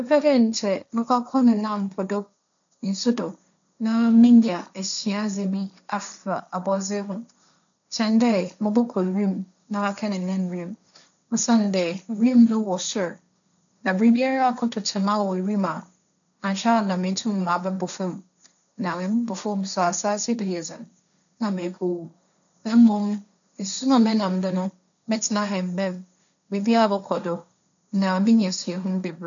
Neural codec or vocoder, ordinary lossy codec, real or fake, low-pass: codec, 16 kHz, 1.1 kbps, Voila-Tokenizer; none; fake; 7.2 kHz